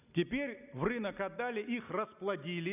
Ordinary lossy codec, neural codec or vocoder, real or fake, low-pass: none; none; real; 3.6 kHz